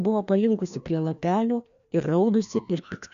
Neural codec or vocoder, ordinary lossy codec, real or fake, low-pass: codec, 16 kHz, 1 kbps, FreqCodec, larger model; AAC, 96 kbps; fake; 7.2 kHz